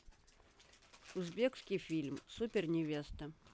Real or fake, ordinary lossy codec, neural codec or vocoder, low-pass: real; none; none; none